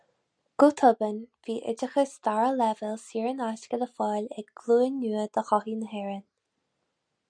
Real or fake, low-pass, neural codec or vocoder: real; 9.9 kHz; none